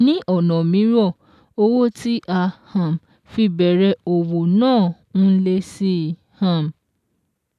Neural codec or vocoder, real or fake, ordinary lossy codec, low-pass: none; real; none; 14.4 kHz